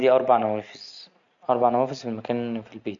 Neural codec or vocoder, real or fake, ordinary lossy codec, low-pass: none; real; none; 7.2 kHz